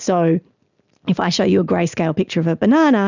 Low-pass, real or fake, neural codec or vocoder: 7.2 kHz; real; none